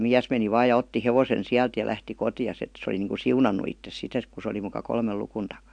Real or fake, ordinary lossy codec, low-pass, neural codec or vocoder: real; MP3, 64 kbps; 9.9 kHz; none